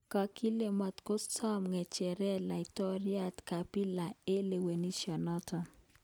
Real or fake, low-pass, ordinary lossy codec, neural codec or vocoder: real; none; none; none